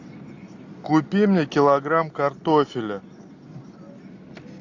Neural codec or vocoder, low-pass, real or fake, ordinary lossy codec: none; 7.2 kHz; real; AAC, 48 kbps